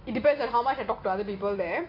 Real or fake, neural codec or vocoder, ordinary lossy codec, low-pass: real; none; AAC, 32 kbps; 5.4 kHz